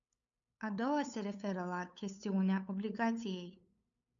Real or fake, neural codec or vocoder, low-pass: fake; codec, 16 kHz, 8 kbps, FunCodec, trained on LibriTTS, 25 frames a second; 7.2 kHz